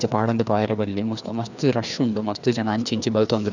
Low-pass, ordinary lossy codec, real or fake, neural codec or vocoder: 7.2 kHz; none; fake; codec, 16 kHz, 2 kbps, FreqCodec, larger model